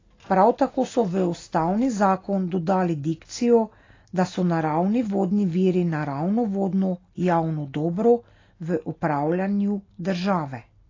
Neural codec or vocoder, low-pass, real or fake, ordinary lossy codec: none; 7.2 kHz; real; AAC, 32 kbps